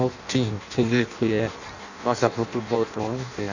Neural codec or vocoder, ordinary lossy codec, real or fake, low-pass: codec, 16 kHz in and 24 kHz out, 0.6 kbps, FireRedTTS-2 codec; none; fake; 7.2 kHz